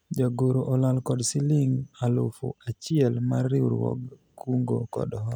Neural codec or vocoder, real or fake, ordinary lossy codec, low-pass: none; real; none; none